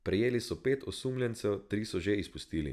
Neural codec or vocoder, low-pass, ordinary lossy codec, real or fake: none; 14.4 kHz; none; real